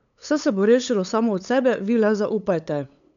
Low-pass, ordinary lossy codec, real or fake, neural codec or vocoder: 7.2 kHz; none; fake; codec, 16 kHz, 8 kbps, FunCodec, trained on LibriTTS, 25 frames a second